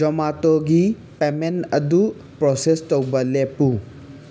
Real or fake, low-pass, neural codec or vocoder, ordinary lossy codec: real; none; none; none